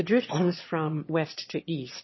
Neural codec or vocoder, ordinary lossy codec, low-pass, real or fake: autoencoder, 22.05 kHz, a latent of 192 numbers a frame, VITS, trained on one speaker; MP3, 24 kbps; 7.2 kHz; fake